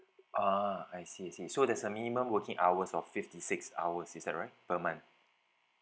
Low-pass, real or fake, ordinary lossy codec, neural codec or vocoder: none; real; none; none